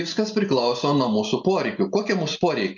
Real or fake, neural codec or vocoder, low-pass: real; none; 7.2 kHz